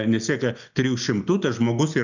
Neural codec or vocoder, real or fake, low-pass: none; real; 7.2 kHz